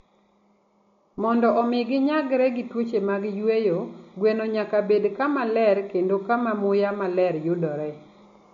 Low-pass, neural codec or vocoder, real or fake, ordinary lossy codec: 7.2 kHz; none; real; MP3, 48 kbps